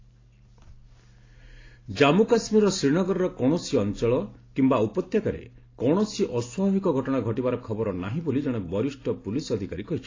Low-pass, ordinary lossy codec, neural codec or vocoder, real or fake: 7.2 kHz; AAC, 32 kbps; none; real